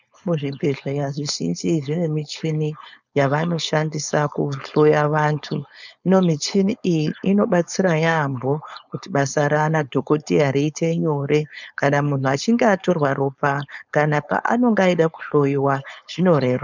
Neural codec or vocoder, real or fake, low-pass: codec, 16 kHz, 4.8 kbps, FACodec; fake; 7.2 kHz